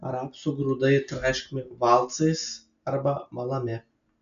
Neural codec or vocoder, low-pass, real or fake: none; 7.2 kHz; real